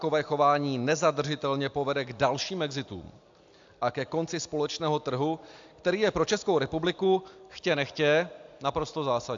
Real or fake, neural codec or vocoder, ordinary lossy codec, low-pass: real; none; AAC, 64 kbps; 7.2 kHz